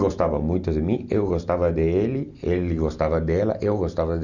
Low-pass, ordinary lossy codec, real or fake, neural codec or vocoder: 7.2 kHz; none; real; none